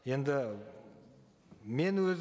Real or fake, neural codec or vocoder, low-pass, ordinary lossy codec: real; none; none; none